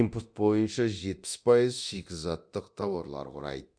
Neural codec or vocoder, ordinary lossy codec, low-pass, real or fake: codec, 24 kHz, 0.9 kbps, DualCodec; none; 9.9 kHz; fake